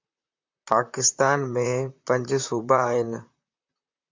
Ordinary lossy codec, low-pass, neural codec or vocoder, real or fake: MP3, 64 kbps; 7.2 kHz; vocoder, 44.1 kHz, 128 mel bands, Pupu-Vocoder; fake